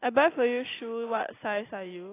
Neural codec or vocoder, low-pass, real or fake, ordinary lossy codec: none; 3.6 kHz; real; AAC, 16 kbps